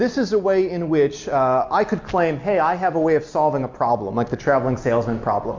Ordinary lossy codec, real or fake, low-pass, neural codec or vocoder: MP3, 64 kbps; real; 7.2 kHz; none